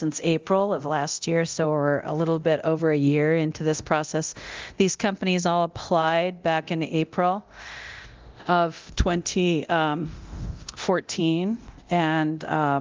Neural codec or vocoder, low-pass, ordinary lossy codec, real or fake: codec, 24 kHz, 0.9 kbps, DualCodec; 7.2 kHz; Opus, 32 kbps; fake